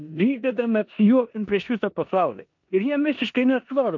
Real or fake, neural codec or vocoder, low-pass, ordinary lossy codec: fake; codec, 16 kHz in and 24 kHz out, 0.9 kbps, LongCat-Audio-Codec, four codebook decoder; 7.2 kHz; MP3, 48 kbps